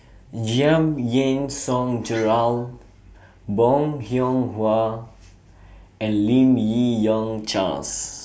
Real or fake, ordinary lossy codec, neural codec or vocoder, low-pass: real; none; none; none